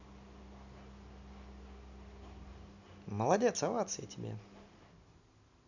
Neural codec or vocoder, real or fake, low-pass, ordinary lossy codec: none; real; 7.2 kHz; none